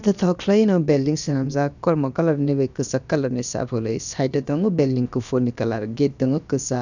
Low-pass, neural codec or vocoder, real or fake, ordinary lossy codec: 7.2 kHz; codec, 16 kHz, about 1 kbps, DyCAST, with the encoder's durations; fake; none